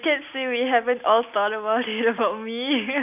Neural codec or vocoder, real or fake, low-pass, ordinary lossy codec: none; real; 3.6 kHz; none